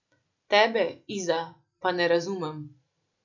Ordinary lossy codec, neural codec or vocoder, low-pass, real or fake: none; none; 7.2 kHz; real